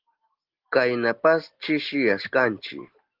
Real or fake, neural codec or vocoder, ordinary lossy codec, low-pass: real; none; Opus, 16 kbps; 5.4 kHz